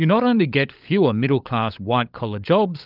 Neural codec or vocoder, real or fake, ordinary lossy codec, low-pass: codec, 16 kHz, 16 kbps, FunCodec, trained on LibriTTS, 50 frames a second; fake; Opus, 24 kbps; 5.4 kHz